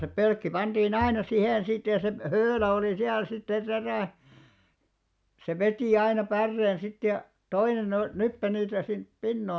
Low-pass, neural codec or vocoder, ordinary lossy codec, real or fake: none; none; none; real